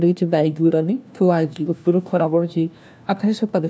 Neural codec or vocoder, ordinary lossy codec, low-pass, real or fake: codec, 16 kHz, 1 kbps, FunCodec, trained on LibriTTS, 50 frames a second; none; none; fake